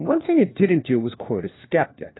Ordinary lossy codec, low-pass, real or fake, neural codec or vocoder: AAC, 16 kbps; 7.2 kHz; fake; codec, 16 kHz, 1 kbps, FunCodec, trained on LibriTTS, 50 frames a second